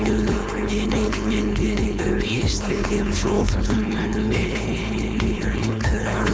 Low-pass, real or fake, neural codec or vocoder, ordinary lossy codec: none; fake; codec, 16 kHz, 4.8 kbps, FACodec; none